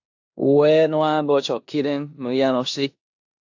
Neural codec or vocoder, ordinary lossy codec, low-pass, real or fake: codec, 16 kHz in and 24 kHz out, 0.9 kbps, LongCat-Audio-Codec, four codebook decoder; AAC, 48 kbps; 7.2 kHz; fake